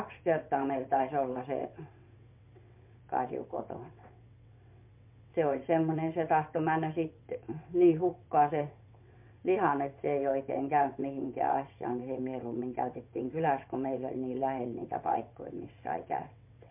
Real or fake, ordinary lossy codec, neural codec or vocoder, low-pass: fake; MP3, 32 kbps; vocoder, 22.05 kHz, 80 mel bands, WaveNeXt; 3.6 kHz